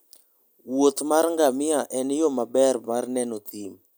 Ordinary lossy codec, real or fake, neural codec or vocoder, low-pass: none; real; none; none